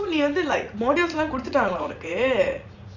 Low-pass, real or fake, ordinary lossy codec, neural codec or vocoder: 7.2 kHz; fake; none; vocoder, 44.1 kHz, 80 mel bands, Vocos